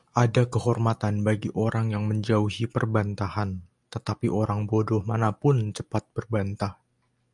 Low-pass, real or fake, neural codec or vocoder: 10.8 kHz; real; none